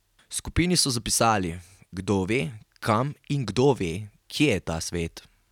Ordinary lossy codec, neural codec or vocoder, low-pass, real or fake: none; none; 19.8 kHz; real